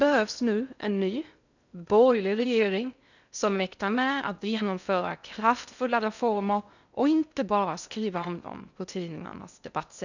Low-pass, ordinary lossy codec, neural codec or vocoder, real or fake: 7.2 kHz; none; codec, 16 kHz in and 24 kHz out, 0.6 kbps, FocalCodec, streaming, 2048 codes; fake